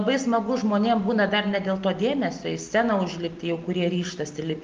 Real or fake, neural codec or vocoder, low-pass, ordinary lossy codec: real; none; 7.2 kHz; Opus, 32 kbps